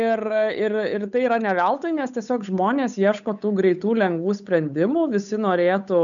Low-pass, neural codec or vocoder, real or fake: 7.2 kHz; codec, 16 kHz, 8 kbps, FunCodec, trained on Chinese and English, 25 frames a second; fake